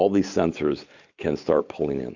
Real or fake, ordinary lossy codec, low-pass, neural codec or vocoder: real; Opus, 64 kbps; 7.2 kHz; none